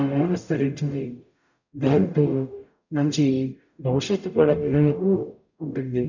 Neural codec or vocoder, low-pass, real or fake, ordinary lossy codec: codec, 44.1 kHz, 0.9 kbps, DAC; 7.2 kHz; fake; none